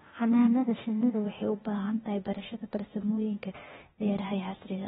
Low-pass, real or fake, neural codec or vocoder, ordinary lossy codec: 7.2 kHz; fake; codec, 16 kHz, 0.8 kbps, ZipCodec; AAC, 16 kbps